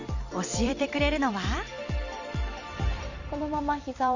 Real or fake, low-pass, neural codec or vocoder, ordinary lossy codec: fake; 7.2 kHz; vocoder, 44.1 kHz, 128 mel bands every 256 samples, BigVGAN v2; none